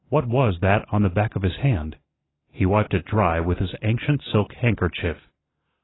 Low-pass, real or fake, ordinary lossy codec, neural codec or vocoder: 7.2 kHz; real; AAC, 16 kbps; none